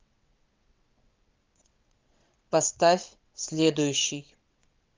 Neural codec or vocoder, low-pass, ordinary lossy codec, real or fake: vocoder, 22.05 kHz, 80 mel bands, WaveNeXt; 7.2 kHz; Opus, 24 kbps; fake